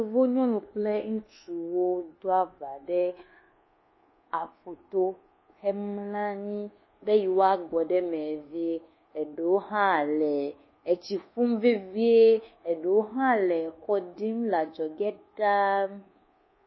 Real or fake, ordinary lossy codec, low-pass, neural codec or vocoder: fake; MP3, 24 kbps; 7.2 kHz; codec, 24 kHz, 1.2 kbps, DualCodec